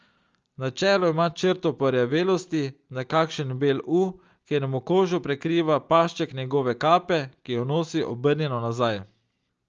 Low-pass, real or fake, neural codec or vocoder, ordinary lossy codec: 7.2 kHz; real; none; Opus, 32 kbps